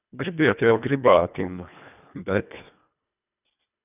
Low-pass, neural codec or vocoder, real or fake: 3.6 kHz; codec, 24 kHz, 1.5 kbps, HILCodec; fake